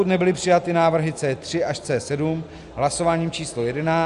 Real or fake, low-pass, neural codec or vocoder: real; 10.8 kHz; none